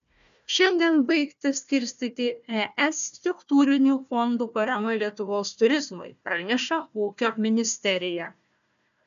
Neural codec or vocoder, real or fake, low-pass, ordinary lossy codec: codec, 16 kHz, 1 kbps, FunCodec, trained on Chinese and English, 50 frames a second; fake; 7.2 kHz; AAC, 96 kbps